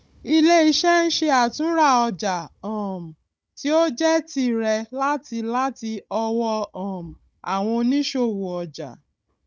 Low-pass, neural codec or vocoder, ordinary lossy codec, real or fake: none; codec, 16 kHz, 16 kbps, FunCodec, trained on Chinese and English, 50 frames a second; none; fake